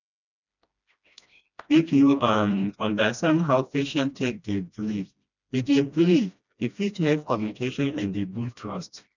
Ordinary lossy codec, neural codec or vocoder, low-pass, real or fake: none; codec, 16 kHz, 1 kbps, FreqCodec, smaller model; 7.2 kHz; fake